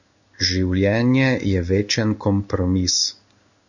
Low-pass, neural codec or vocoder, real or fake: 7.2 kHz; codec, 16 kHz in and 24 kHz out, 1 kbps, XY-Tokenizer; fake